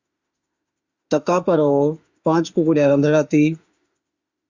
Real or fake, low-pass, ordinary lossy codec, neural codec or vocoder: fake; 7.2 kHz; Opus, 64 kbps; autoencoder, 48 kHz, 32 numbers a frame, DAC-VAE, trained on Japanese speech